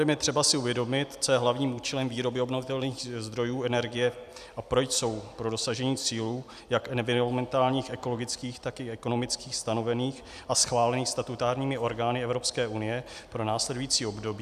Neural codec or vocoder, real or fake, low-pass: none; real; 14.4 kHz